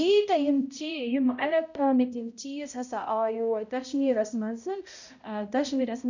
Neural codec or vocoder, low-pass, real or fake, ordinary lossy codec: codec, 16 kHz, 0.5 kbps, X-Codec, HuBERT features, trained on balanced general audio; 7.2 kHz; fake; none